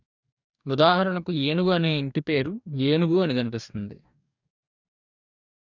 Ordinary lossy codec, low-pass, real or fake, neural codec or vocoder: none; 7.2 kHz; fake; codec, 44.1 kHz, 2.6 kbps, DAC